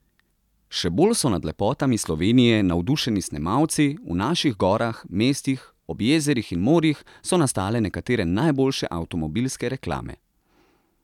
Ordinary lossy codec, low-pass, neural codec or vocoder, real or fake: none; 19.8 kHz; none; real